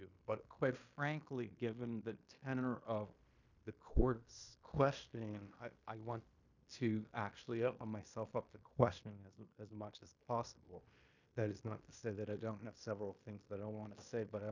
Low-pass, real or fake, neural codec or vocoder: 7.2 kHz; fake; codec, 16 kHz in and 24 kHz out, 0.9 kbps, LongCat-Audio-Codec, fine tuned four codebook decoder